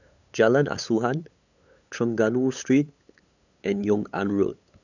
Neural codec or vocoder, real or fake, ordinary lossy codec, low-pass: codec, 16 kHz, 8 kbps, FunCodec, trained on LibriTTS, 25 frames a second; fake; none; 7.2 kHz